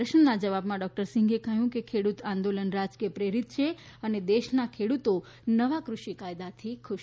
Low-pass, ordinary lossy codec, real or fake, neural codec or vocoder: none; none; real; none